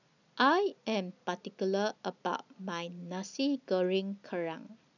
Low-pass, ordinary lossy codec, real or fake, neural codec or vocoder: 7.2 kHz; none; real; none